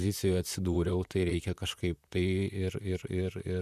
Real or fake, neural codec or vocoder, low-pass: fake; vocoder, 44.1 kHz, 128 mel bands, Pupu-Vocoder; 14.4 kHz